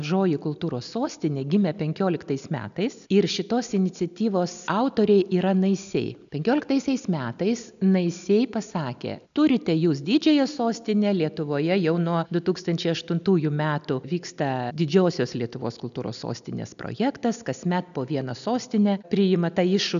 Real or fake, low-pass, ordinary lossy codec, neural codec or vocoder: real; 7.2 kHz; MP3, 96 kbps; none